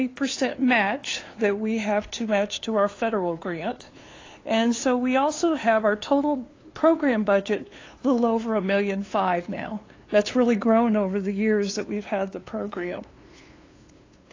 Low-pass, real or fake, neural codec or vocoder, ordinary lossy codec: 7.2 kHz; fake; codec, 16 kHz, 2 kbps, FunCodec, trained on LibriTTS, 25 frames a second; AAC, 32 kbps